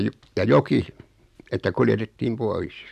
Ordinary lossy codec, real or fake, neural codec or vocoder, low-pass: MP3, 96 kbps; real; none; 14.4 kHz